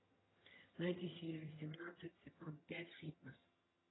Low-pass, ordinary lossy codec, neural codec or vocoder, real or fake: 7.2 kHz; AAC, 16 kbps; vocoder, 22.05 kHz, 80 mel bands, HiFi-GAN; fake